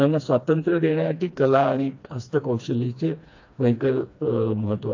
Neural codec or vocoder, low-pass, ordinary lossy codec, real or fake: codec, 16 kHz, 2 kbps, FreqCodec, smaller model; 7.2 kHz; AAC, 48 kbps; fake